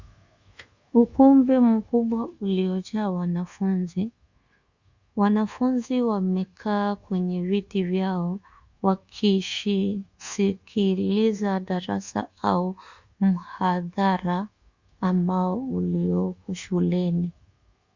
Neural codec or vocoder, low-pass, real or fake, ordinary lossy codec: codec, 24 kHz, 1.2 kbps, DualCodec; 7.2 kHz; fake; Opus, 64 kbps